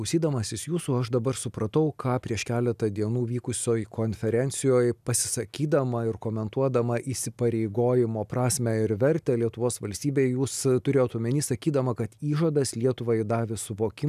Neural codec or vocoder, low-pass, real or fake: none; 14.4 kHz; real